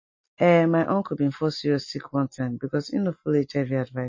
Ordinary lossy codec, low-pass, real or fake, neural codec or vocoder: MP3, 32 kbps; 7.2 kHz; real; none